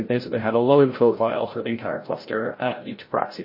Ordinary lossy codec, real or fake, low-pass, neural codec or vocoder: MP3, 24 kbps; fake; 5.4 kHz; codec, 16 kHz, 0.5 kbps, FreqCodec, larger model